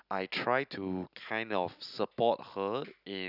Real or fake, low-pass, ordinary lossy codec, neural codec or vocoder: real; 5.4 kHz; none; none